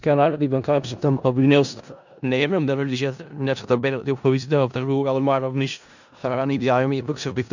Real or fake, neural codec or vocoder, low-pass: fake; codec, 16 kHz in and 24 kHz out, 0.4 kbps, LongCat-Audio-Codec, four codebook decoder; 7.2 kHz